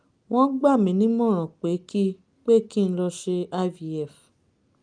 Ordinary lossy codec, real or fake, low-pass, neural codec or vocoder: Opus, 32 kbps; real; 9.9 kHz; none